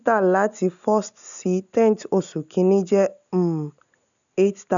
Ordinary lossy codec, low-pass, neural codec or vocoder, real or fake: none; 7.2 kHz; none; real